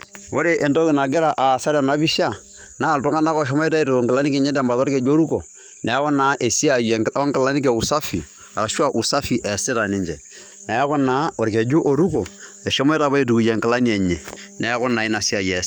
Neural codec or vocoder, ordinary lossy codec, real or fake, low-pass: codec, 44.1 kHz, 7.8 kbps, DAC; none; fake; none